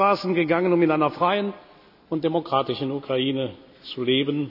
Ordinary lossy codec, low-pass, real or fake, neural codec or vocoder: none; 5.4 kHz; real; none